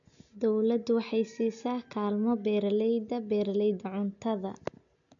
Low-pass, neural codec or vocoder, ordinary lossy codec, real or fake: 7.2 kHz; none; none; real